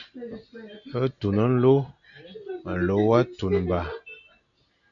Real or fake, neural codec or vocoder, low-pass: real; none; 7.2 kHz